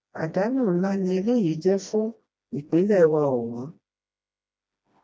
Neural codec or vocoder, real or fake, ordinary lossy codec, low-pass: codec, 16 kHz, 1 kbps, FreqCodec, smaller model; fake; none; none